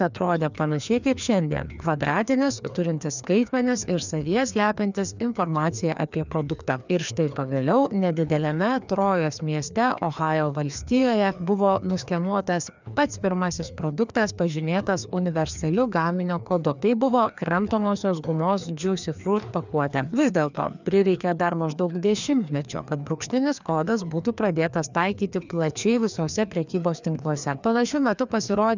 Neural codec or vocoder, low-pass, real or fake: codec, 16 kHz, 2 kbps, FreqCodec, larger model; 7.2 kHz; fake